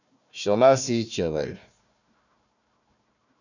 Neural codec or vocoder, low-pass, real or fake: codec, 16 kHz, 1 kbps, FunCodec, trained on Chinese and English, 50 frames a second; 7.2 kHz; fake